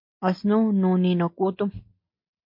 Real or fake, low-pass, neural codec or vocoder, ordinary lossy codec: real; 5.4 kHz; none; MP3, 32 kbps